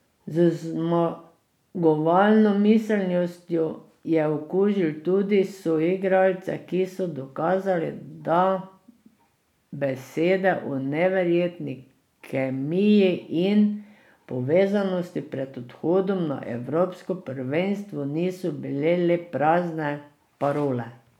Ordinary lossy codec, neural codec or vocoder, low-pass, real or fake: none; none; 19.8 kHz; real